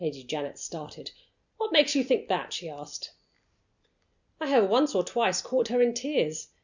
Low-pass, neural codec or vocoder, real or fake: 7.2 kHz; none; real